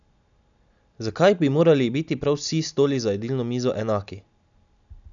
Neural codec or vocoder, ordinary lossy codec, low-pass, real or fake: none; none; 7.2 kHz; real